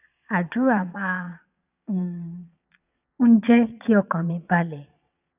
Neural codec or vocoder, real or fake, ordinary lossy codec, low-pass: vocoder, 22.05 kHz, 80 mel bands, Vocos; fake; none; 3.6 kHz